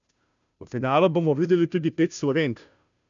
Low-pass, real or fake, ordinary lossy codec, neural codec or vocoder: 7.2 kHz; fake; none; codec, 16 kHz, 0.5 kbps, FunCodec, trained on Chinese and English, 25 frames a second